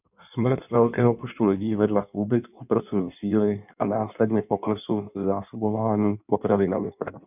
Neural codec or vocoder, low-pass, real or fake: codec, 16 kHz in and 24 kHz out, 1.1 kbps, FireRedTTS-2 codec; 3.6 kHz; fake